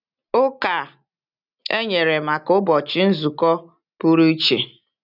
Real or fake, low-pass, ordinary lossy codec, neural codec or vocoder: real; 5.4 kHz; none; none